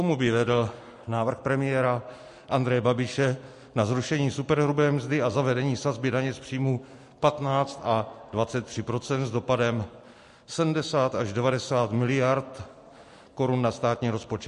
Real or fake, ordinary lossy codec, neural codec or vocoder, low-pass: real; MP3, 48 kbps; none; 14.4 kHz